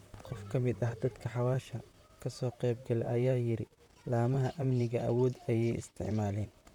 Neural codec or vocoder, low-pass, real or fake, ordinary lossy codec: vocoder, 44.1 kHz, 128 mel bands, Pupu-Vocoder; 19.8 kHz; fake; none